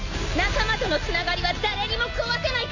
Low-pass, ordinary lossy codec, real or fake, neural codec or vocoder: 7.2 kHz; none; real; none